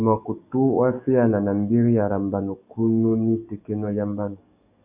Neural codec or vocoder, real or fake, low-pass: codec, 44.1 kHz, 7.8 kbps, DAC; fake; 3.6 kHz